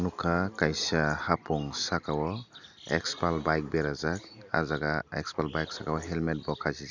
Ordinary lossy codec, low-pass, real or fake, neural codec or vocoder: none; 7.2 kHz; real; none